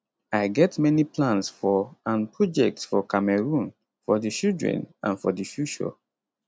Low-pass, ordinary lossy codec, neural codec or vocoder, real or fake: none; none; none; real